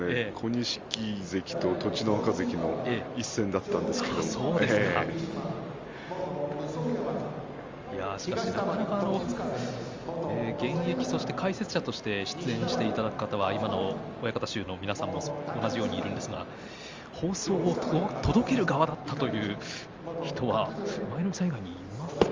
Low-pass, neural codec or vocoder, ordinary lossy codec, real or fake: 7.2 kHz; none; Opus, 32 kbps; real